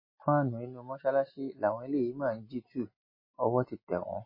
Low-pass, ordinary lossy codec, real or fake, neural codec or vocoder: 5.4 kHz; MP3, 24 kbps; real; none